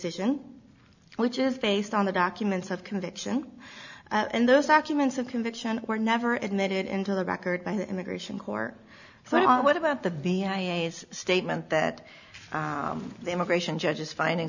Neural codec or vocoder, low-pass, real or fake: none; 7.2 kHz; real